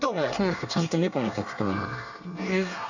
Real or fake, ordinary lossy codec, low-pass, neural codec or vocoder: fake; none; 7.2 kHz; codec, 24 kHz, 1 kbps, SNAC